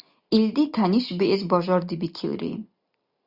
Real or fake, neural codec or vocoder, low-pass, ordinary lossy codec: real; none; 5.4 kHz; Opus, 64 kbps